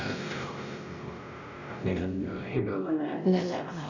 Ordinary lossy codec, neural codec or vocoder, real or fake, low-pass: none; codec, 16 kHz, 0.5 kbps, X-Codec, WavLM features, trained on Multilingual LibriSpeech; fake; 7.2 kHz